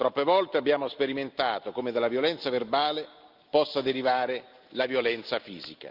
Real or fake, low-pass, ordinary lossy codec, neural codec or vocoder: real; 5.4 kHz; Opus, 24 kbps; none